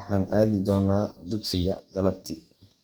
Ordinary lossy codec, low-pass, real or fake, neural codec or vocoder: none; none; fake; codec, 44.1 kHz, 2.6 kbps, DAC